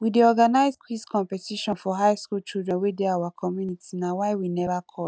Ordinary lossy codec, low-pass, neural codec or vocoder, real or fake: none; none; none; real